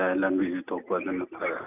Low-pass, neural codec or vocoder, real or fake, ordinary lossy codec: 3.6 kHz; none; real; none